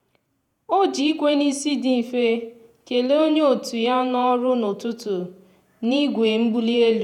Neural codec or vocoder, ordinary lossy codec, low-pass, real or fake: vocoder, 48 kHz, 128 mel bands, Vocos; none; 19.8 kHz; fake